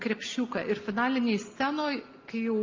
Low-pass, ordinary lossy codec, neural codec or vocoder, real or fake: 7.2 kHz; Opus, 24 kbps; none; real